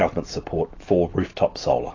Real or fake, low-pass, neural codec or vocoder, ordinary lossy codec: real; 7.2 kHz; none; AAC, 32 kbps